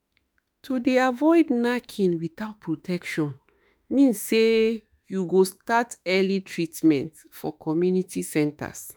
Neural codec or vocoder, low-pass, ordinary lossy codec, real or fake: autoencoder, 48 kHz, 32 numbers a frame, DAC-VAE, trained on Japanese speech; none; none; fake